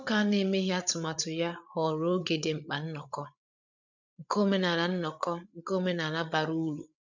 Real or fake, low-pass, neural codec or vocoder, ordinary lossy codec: fake; 7.2 kHz; codec, 16 kHz, 8 kbps, FreqCodec, larger model; none